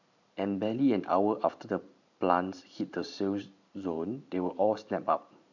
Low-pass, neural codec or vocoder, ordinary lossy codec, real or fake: 7.2 kHz; vocoder, 44.1 kHz, 128 mel bands every 512 samples, BigVGAN v2; none; fake